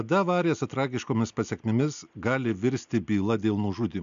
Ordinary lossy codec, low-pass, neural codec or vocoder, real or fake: MP3, 64 kbps; 7.2 kHz; none; real